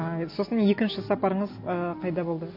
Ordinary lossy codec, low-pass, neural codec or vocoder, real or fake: MP3, 32 kbps; 5.4 kHz; none; real